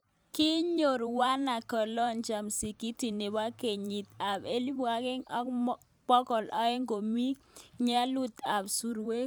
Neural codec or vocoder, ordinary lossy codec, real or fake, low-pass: vocoder, 44.1 kHz, 128 mel bands every 512 samples, BigVGAN v2; none; fake; none